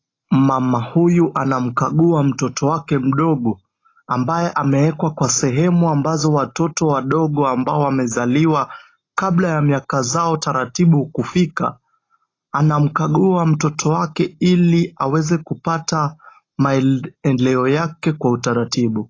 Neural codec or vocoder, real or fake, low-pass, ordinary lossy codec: none; real; 7.2 kHz; AAC, 32 kbps